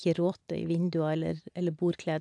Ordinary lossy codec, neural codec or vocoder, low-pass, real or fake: AAC, 64 kbps; vocoder, 44.1 kHz, 128 mel bands every 256 samples, BigVGAN v2; 10.8 kHz; fake